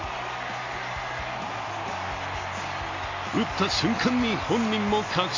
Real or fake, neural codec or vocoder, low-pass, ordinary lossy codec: real; none; 7.2 kHz; AAC, 32 kbps